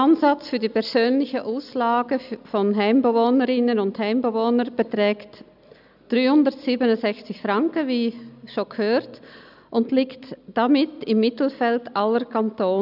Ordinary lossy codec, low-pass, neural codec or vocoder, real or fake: none; 5.4 kHz; none; real